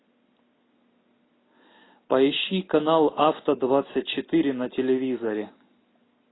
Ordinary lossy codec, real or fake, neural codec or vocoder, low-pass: AAC, 16 kbps; real; none; 7.2 kHz